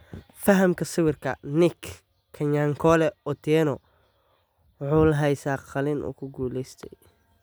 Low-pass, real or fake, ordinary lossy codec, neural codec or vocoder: none; real; none; none